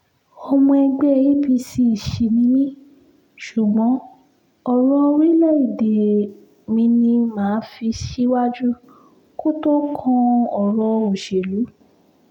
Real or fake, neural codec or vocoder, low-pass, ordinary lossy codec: real; none; 19.8 kHz; none